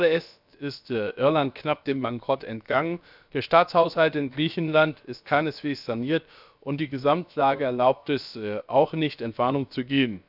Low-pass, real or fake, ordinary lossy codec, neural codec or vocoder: 5.4 kHz; fake; none; codec, 16 kHz, about 1 kbps, DyCAST, with the encoder's durations